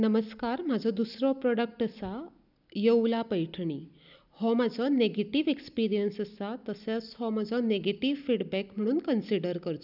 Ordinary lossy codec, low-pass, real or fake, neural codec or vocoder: none; 5.4 kHz; real; none